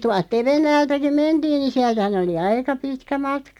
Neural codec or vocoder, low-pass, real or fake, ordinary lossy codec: none; 19.8 kHz; real; none